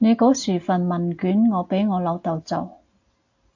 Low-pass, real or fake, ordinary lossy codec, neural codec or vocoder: 7.2 kHz; real; AAC, 48 kbps; none